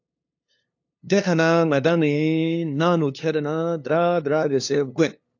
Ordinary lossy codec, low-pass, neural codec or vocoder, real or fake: AAC, 48 kbps; 7.2 kHz; codec, 16 kHz, 2 kbps, FunCodec, trained on LibriTTS, 25 frames a second; fake